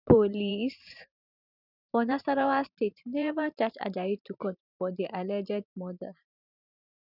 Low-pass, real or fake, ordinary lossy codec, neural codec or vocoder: 5.4 kHz; fake; none; vocoder, 44.1 kHz, 128 mel bands every 512 samples, BigVGAN v2